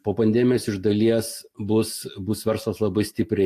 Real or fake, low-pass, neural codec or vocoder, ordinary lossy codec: real; 14.4 kHz; none; AAC, 64 kbps